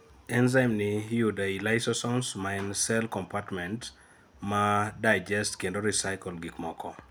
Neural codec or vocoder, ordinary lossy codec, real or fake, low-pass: none; none; real; none